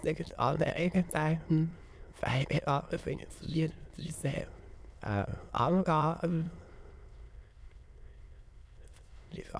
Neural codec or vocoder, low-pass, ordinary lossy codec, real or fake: autoencoder, 22.05 kHz, a latent of 192 numbers a frame, VITS, trained on many speakers; none; none; fake